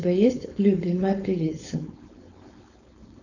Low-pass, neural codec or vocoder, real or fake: 7.2 kHz; codec, 16 kHz, 4.8 kbps, FACodec; fake